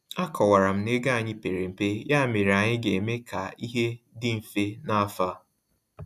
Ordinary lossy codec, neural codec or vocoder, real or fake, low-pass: none; none; real; 14.4 kHz